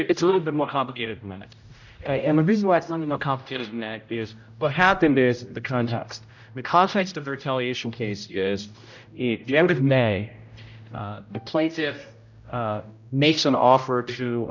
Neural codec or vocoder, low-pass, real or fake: codec, 16 kHz, 0.5 kbps, X-Codec, HuBERT features, trained on general audio; 7.2 kHz; fake